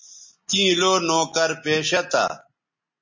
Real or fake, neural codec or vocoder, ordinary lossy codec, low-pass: real; none; MP3, 32 kbps; 7.2 kHz